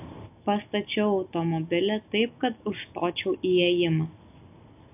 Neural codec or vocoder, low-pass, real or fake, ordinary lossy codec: none; 3.6 kHz; real; AAC, 32 kbps